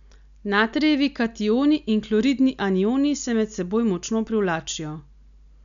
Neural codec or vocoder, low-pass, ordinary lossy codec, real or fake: none; 7.2 kHz; MP3, 96 kbps; real